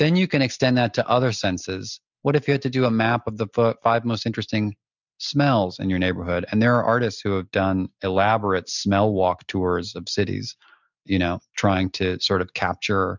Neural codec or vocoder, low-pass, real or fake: none; 7.2 kHz; real